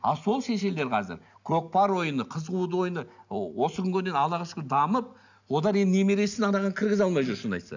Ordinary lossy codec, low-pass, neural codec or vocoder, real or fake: none; 7.2 kHz; none; real